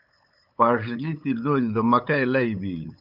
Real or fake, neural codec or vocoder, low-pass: fake; codec, 16 kHz, 8 kbps, FunCodec, trained on LibriTTS, 25 frames a second; 5.4 kHz